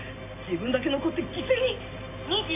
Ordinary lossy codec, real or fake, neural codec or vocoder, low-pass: AAC, 24 kbps; real; none; 3.6 kHz